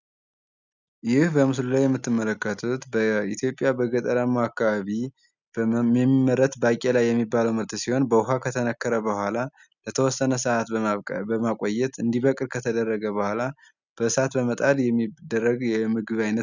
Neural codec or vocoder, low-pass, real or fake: none; 7.2 kHz; real